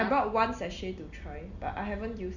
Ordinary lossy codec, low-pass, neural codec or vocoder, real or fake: none; 7.2 kHz; none; real